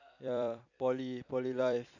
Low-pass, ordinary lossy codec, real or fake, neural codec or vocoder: 7.2 kHz; AAC, 48 kbps; fake; vocoder, 44.1 kHz, 128 mel bands every 256 samples, BigVGAN v2